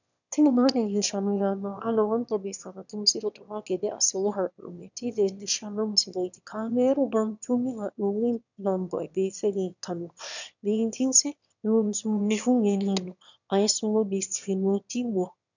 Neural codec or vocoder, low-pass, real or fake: autoencoder, 22.05 kHz, a latent of 192 numbers a frame, VITS, trained on one speaker; 7.2 kHz; fake